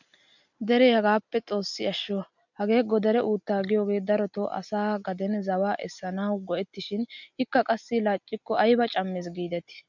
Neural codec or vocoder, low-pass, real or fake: none; 7.2 kHz; real